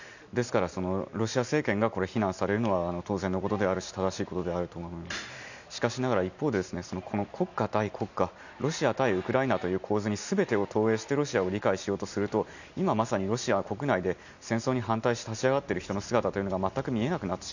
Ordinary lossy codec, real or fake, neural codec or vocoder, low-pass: none; real; none; 7.2 kHz